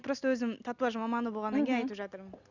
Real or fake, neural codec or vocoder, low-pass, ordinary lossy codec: real; none; 7.2 kHz; none